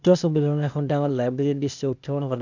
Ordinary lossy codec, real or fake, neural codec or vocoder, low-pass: none; fake; codec, 16 kHz, 0.8 kbps, ZipCodec; 7.2 kHz